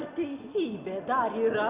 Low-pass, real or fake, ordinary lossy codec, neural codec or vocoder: 3.6 kHz; real; Opus, 64 kbps; none